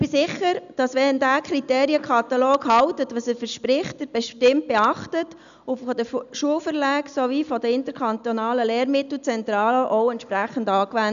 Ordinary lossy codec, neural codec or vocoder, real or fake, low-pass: none; none; real; 7.2 kHz